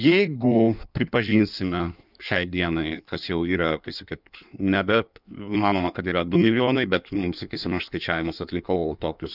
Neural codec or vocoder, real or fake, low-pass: codec, 16 kHz in and 24 kHz out, 1.1 kbps, FireRedTTS-2 codec; fake; 5.4 kHz